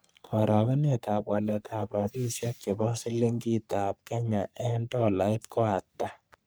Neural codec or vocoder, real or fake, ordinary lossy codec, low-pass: codec, 44.1 kHz, 3.4 kbps, Pupu-Codec; fake; none; none